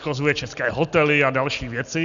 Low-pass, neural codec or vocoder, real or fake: 7.2 kHz; none; real